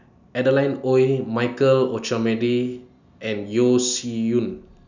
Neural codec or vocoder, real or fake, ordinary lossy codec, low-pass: none; real; none; 7.2 kHz